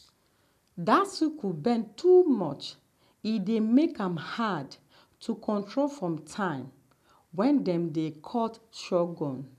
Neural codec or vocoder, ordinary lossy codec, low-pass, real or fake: none; none; 14.4 kHz; real